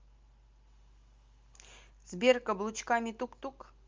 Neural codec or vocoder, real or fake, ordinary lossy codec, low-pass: none; real; Opus, 32 kbps; 7.2 kHz